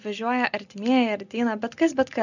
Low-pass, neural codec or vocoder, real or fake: 7.2 kHz; none; real